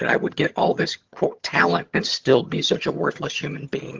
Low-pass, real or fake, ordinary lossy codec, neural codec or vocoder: 7.2 kHz; fake; Opus, 16 kbps; vocoder, 22.05 kHz, 80 mel bands, HiFi-GAN